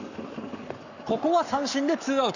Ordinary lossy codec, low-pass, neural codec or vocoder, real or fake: none; 7.2 kHz; codec, 44.1 kHz, 7.8 kbps, Pupu-Codec; fake